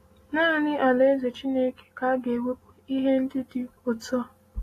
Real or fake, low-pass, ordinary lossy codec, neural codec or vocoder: real; 14.4 kHz; AAC, 48 kbps; none